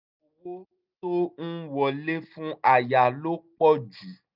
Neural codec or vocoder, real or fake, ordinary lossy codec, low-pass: none; real; none; 5.4 kHz